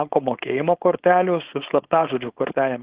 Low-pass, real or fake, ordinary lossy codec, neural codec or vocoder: 3.6 kHz; fake; Opus, 16 kbps; codec, 16 kHz, 4.8 kbps, FACodec